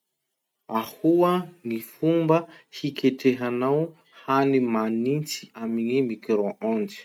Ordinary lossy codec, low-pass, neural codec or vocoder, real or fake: none; 19.8 kHz; none; real